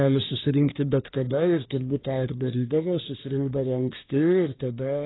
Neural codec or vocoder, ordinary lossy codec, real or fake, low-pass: codec, 24 kHz, 1 kbps, SNAC; AAC, 16 kbps; fake; 7.2 kHz